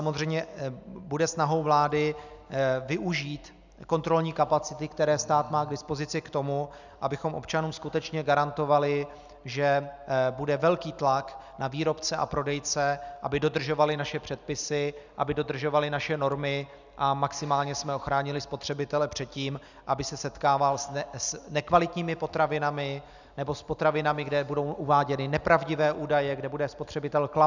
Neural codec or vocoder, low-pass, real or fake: none; 7.2 kHz; real